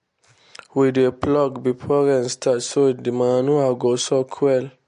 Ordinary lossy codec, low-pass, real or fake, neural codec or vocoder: MP3, 48 kbps; 14.4 kHz; real; none